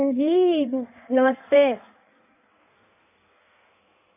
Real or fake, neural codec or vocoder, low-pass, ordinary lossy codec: fake; codec, 16 kHz in and 24 kHz out, 1.1 kbps, FireRedTTS-2 codec; 3.6 kHz; none